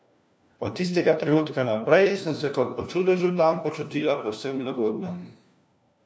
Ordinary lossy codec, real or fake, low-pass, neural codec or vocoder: none; fake; none; codec, 16 kHz, 1 kbps, FunCodec, trained on LibriTTS, 50 frames a second